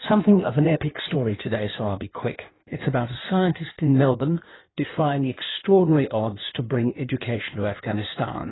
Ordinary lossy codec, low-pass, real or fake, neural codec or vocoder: AAC, 16 kbps; 7.2 kHz; fake; codec, 16 kHz in and 24 kHz out, 1.1 kbps, FireRedTTS-2 codec